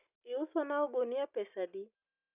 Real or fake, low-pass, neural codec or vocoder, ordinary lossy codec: real; 3.6 kHz; none; none